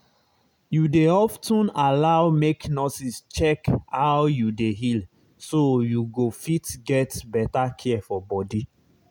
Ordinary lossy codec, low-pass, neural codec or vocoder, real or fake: none; none; none; real